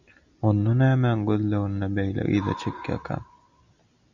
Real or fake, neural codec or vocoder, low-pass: real; none; 7.2 kHz